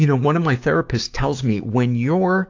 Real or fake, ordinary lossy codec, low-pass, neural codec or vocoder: fake; AAC, 48 kbps; 7.2 kHz; codec, 16 kHz, 6 kbps, DAC